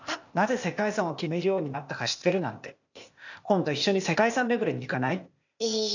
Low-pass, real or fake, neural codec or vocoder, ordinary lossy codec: 7.2 kHz; fake; codec, 16 kHz, 0.8 kbps, ZipCodec; none